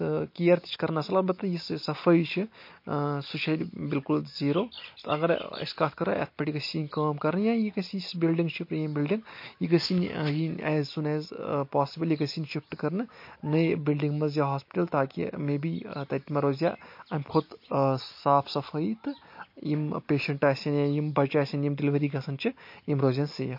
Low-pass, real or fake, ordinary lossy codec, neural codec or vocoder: 5.4 kHz; real; MP3, 32 kbps; none